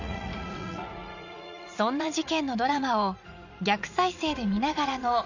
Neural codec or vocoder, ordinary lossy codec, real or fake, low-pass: vocoder, 44.1 kHz, 80 mel bands, Vocos; none; fake; 7.2 kHz